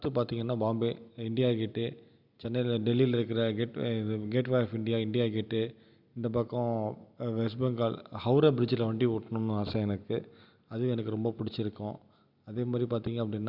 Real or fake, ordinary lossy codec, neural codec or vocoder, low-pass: real; none; none; 5.4 kHz